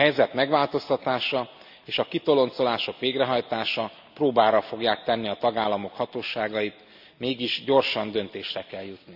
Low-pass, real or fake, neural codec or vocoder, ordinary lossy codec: 5.4 kHz; real; none; none